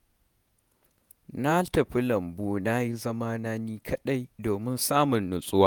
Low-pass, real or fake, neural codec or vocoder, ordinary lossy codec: none; fake; vocoder, 48 kHz, 128 mel bands, Vocos; none